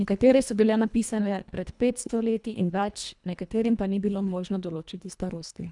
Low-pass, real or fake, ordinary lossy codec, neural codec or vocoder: 10.8 kHz; fake; none; codec, 24 kHz, 1.5 kbps, HILCodec